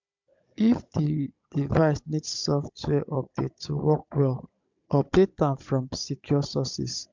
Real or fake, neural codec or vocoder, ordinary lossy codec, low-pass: fake; codec, 16 kHz, 16 kbps, FunCodec, trained on Chinese and English, 50 frames a second; MP3, 64 kbps; 7.2 kHz